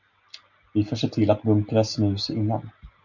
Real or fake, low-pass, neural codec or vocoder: real; 7.2 kHz; none